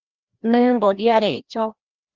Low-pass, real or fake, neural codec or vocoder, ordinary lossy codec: 7.2 kHz; fake; codec, 16 kHz, 1 kbps, FreqCodec, larger model; Opus, 24 kbps